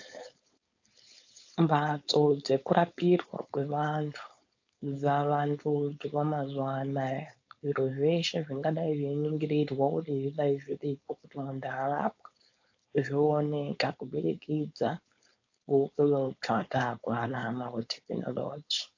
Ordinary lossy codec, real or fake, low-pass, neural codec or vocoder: AAC, 48 kbps; fake; 7.2 kHz; codec, 16 kHz, 4.8 kbps, FACodec